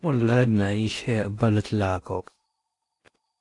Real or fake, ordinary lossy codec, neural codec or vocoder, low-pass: fake; AAC, 48 kbps; codec, 16 kHz in and 24 kHz out, 0.8 kbps, FocalCodec, streaming, 65536 codes; 10.8 kHz